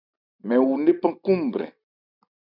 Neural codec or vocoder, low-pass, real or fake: none; 5.4 kHz; real